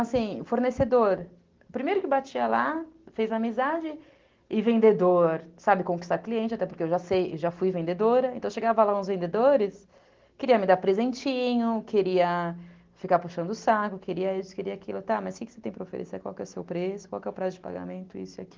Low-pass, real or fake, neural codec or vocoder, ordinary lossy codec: 7.2 kHz; real; none; Opus, 16 kbps